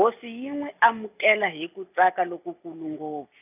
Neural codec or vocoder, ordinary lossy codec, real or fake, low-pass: none; none; real; 3.6 kHz